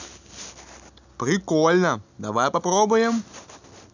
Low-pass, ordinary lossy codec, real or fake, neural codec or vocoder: 7.2 kHz; none; real; none